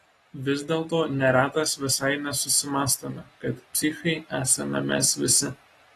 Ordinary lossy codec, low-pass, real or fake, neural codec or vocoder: AAC, 32 kbps; 19.8 kHz; real; none